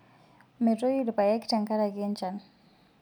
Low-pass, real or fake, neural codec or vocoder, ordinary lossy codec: 19.8 kHz; real; none; none